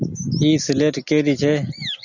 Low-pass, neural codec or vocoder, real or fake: 7.2 kHz; none; real